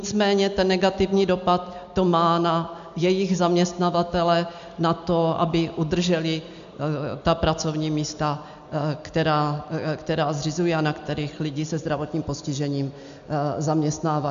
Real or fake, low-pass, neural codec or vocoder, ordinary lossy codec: real; 7.2 kHz; none; MP3, 64 kbps